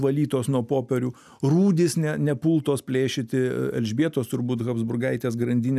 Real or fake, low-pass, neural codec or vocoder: real; 14.4 kHz; none